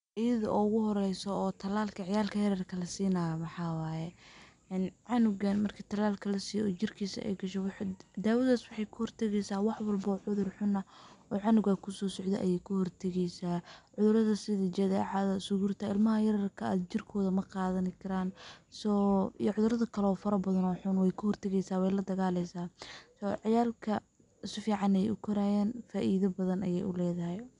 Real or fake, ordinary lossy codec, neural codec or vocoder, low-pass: real; MP3, 96 kbps; none; 9.9 kHz